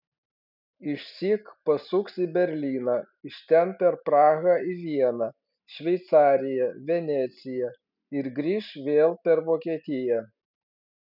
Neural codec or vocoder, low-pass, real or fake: none; 5.4 kHz; real